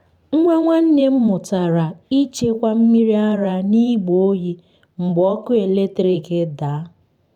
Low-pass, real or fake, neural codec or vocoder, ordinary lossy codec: 19.8 kHz; fake; vocoder, 44.1 kHz, 128 mel bands every 512 samples, BigVGAN v2; none